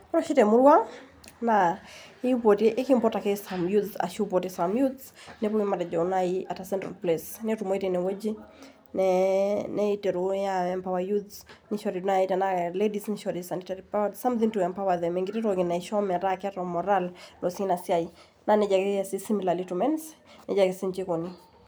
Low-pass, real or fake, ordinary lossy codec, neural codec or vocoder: none; real; none; none